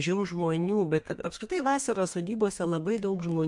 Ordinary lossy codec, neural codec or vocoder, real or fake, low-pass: MP3, 64 kbps; codec, 32 kHz, 1.9 kbps, SNAC; fake; 10.8 kHz